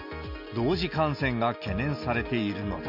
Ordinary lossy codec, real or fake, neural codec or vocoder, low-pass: MP3, 48 kbps; real; none; 5.4 kHz